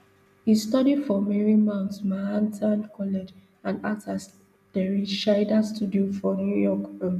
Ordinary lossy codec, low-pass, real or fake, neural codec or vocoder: none; 14.4 kHz; real; none